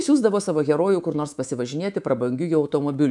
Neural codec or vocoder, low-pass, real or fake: codec, 24 kHz, 3.1 kbps, DualCodec; 10.8 kHz; fake